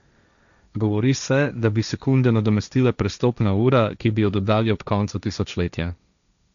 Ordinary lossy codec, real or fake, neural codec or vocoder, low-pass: none; fake; codec, 16 kHz, 1.1 kbps, Voila-Tokenizer; 7.2 kHz